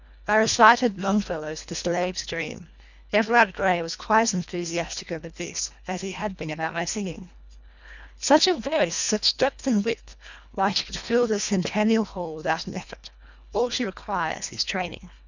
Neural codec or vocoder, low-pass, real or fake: codec, 24 kHz, 1.5 kbps, HILCodec; 7.2 kHz; fake